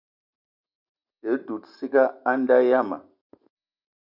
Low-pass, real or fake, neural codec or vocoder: 5.4 kHz; real; none